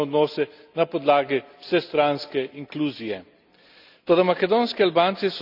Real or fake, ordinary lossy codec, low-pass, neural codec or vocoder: real; none; 5.4 kHz; none